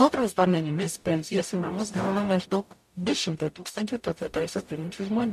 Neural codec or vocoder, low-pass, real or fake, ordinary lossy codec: codec, 44.1 kHz, 0.9 kbps, DAC; 14.4 kHz; fake; MP3, 64 kbps